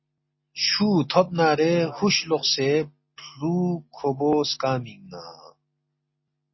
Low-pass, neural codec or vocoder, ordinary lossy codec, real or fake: 7.2 kHz; none; MP3, 24 kbps; real